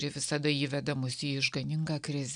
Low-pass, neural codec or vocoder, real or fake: 9.9 kHz; none; real